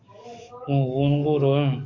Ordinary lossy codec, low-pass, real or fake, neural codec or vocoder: MP3, 48 kbps; 7.2 kHz; fake; codec, 44.1 kHz, 7.8 kbps, DAC